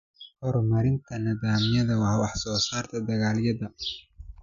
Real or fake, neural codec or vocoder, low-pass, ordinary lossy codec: real; none; 7.2 kHz; none